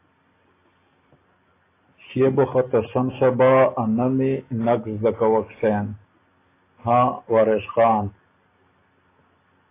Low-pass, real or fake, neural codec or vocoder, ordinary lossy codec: 3.6 kHz; real; none; AAC, 24 kbps